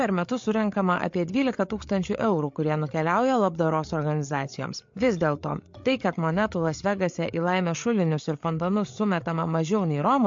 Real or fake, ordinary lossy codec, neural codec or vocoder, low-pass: fake; MP3, 48 kbps; codec, 16 kHz, 8 kbps, FreqCodec, larger model; 7.2 kHz